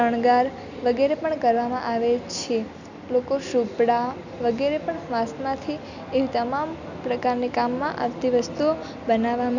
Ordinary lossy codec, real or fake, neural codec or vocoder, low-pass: none; real; none; 7.2 kHz